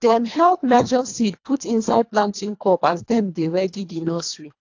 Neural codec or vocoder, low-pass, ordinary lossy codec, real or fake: codec, 24 kHz, 1.5 kbps, HILCodec; 7.2 kHz; AAC, 48 kbps; fake